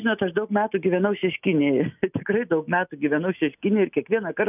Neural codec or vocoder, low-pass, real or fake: none; 3.6 kHz; real